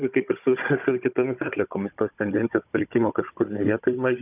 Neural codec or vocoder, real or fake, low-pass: codec, 16 kHz, 16 kbps, FunCodec, trained on Chinese and English, 50 frames a second; fake; 3.6 kHz